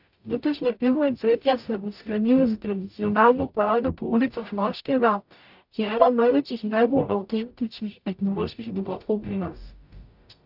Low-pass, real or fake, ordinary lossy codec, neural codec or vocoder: 5.4 kHz; fake; AAC, 48 kbps; codec, 44.1 kHz, 0.9 kbps, DAC